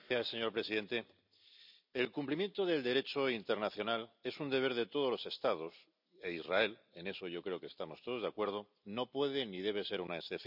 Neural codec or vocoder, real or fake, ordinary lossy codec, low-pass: none; real; none; 5.4 kHz